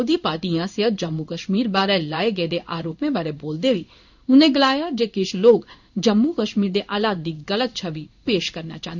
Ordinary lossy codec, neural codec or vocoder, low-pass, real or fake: none; codec, 16 kHz in and 24 kHz out, 1 kbps, XY-Tokenizer; 7.2 kHz; fake